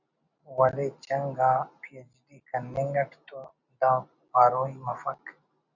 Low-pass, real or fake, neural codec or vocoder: 7.2 kHz; real; none